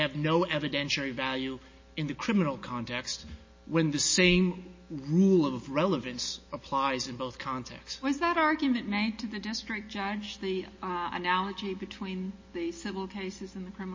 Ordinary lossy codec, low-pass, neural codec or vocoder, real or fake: MP3, 32 kbps; 7.2 kHz; none; real